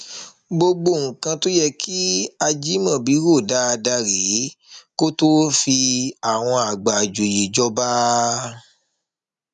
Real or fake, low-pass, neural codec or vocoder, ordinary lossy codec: real; 10.8 kHz; none; none